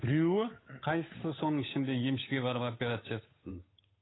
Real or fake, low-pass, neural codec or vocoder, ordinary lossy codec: fake; 7.2 kHz; codec, 16 kHz, 8 kbps, FunCodec, trained on Chinese and English, 25 frames a second; AAC, 16 kbps